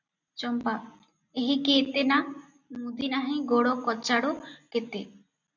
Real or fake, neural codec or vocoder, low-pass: real; none; 7.2 kHz